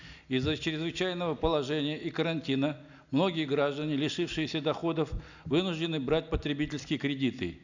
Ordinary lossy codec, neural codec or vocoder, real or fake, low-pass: none; none; real; 7.2 kHz